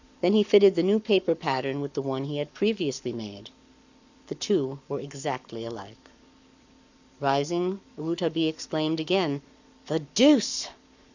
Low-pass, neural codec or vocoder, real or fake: 7.2 kHz; codec, 44.1 kHz, 7.8 kbps, Pupu-Codec; fake